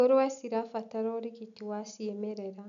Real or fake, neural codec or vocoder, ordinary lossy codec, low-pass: real; none; none; 7.2 kHz